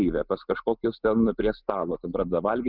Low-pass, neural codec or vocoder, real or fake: 5.4 kHz; none; real